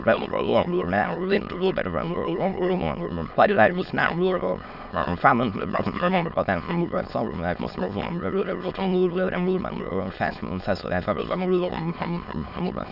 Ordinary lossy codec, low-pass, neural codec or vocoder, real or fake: none; 5.4 kHz; autoencoder, 22.05 kHz, a latent of 192 numbers a frame, VITS, trained on many speakers; fake